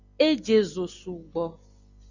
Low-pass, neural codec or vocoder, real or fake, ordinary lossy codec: 7.2 kHz; none; real; Opus, 64 kbps